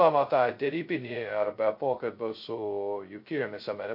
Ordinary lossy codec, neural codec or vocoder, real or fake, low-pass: MP3, 32 kbps; codec, 16 kHz, 0.2 kbps, FocalCodec; fake; 5.4 kHz